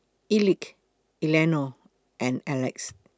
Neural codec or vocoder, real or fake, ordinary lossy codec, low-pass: none; real; none; none